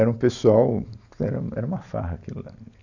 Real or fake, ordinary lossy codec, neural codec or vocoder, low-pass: real; none; none; 7.2 kHz